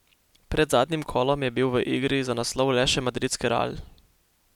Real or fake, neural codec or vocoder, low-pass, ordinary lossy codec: real; none; 19.8 kHz; none